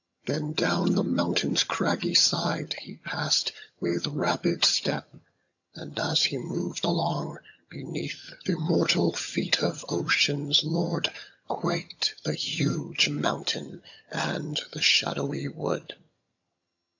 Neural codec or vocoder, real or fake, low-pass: vocoder, 22.05 kHz, 80 mel bands, HiFi-GAN; fake; 7.2 kHz